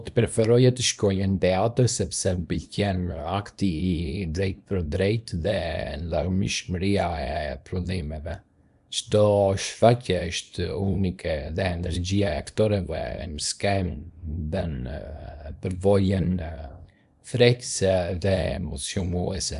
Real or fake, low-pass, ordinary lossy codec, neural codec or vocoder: fake; 10.8 kHz; none; codec, 24 kHz, 0.9 kbps, WavTokenizer, small release